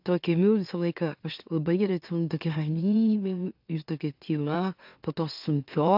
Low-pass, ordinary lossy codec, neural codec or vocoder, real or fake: 5.4 kHz; AAC, 48 kbps; autoencoder, 44.1 kHz, a latent of 192 numbers a frame, MeloTTS; fake